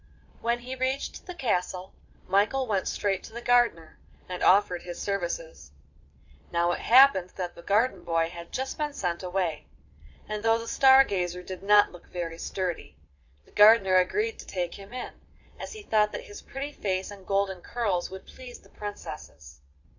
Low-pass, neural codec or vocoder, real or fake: 7.2 kHz; vocoder, 22.05 kHz, 80 mel bands, Vocos; fake